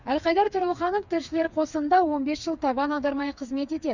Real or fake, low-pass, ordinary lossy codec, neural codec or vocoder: fake; 7.2 kHz; none; codec, 16 kHz, 4 kbps, FreqCodec, smaller model